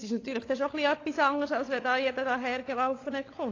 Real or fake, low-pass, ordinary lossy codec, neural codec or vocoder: fake; 7.2 kHz; AAC, 32 kbps; codec, 16 kHz, 16 kbps, FunCodec, trained on LibriTTS, 50 frames a second